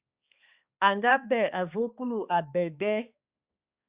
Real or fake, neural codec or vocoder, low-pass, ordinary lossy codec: fake; codec, 16 kHz, 2 kbps, X-Codec, HuBERT features, trained on balanced general audio; 3.6 kHz; Opus, 64 kbps